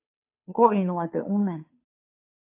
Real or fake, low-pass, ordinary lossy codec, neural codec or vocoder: fake; 3.6 kHz; AAC, 32 kbps; codec, 16 kHz, 2 kbps, FunCodec, trained on Chinese and English, 25 frames a second